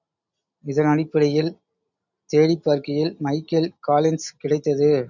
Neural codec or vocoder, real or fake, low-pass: none; real; 7.2 kHz